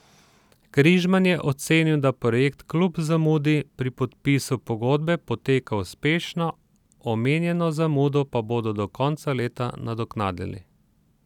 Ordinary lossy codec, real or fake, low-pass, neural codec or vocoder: none; real; 19.8 kHz; none